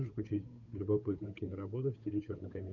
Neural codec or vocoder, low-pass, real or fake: codec, 16 kHz, 8 kbps, FunCodec, trained on Chinese and English, 25 frames a second; 7.2 kHz; fake